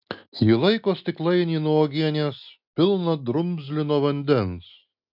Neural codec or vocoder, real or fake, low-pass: none; real; 5.4 kHz